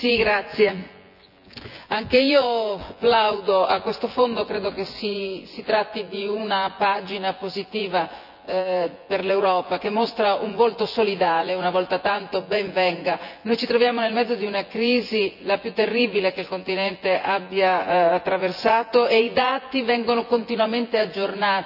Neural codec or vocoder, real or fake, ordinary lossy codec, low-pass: vocoder, 24 kHz, 100 mel bands, Vocos; fake; none; 5.4 kHz